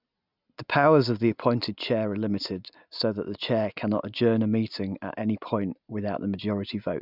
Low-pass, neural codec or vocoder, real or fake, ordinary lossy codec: 5.4 kHz; none; real; none